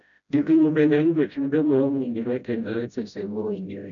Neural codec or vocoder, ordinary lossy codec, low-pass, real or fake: codec, 16 kHz, 0.5 kbps, FreqCodec, smaller model; none; 7.2 kHz; fake